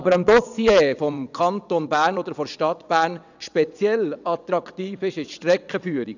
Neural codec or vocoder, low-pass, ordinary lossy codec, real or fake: vocoder, 22.05 kHz, 80 mel bands, WaveNeXt; 7.2 kHz; none; fake